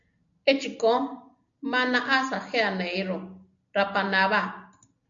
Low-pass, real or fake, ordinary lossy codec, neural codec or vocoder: 7.2 kHz; real; MP3, 64 kbps; none